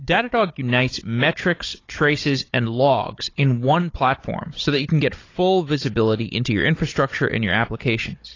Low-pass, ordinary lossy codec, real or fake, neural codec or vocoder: 7.2 kHz; AAC, 32 kbps; fake; codec, 16 kHz, 16 kbps, FunCodec, trained on Chinese and English, 50 frames a second